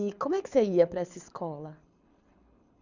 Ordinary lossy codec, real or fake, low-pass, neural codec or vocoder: none; fake; 7.2 kHz; codec, 24 kHz, 6 kbps, HILCodec